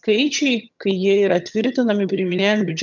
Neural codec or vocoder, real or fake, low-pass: vocoder, 22.05 kHz, 80 mel bands, HiFi-GAN; fake; 7.2 kHz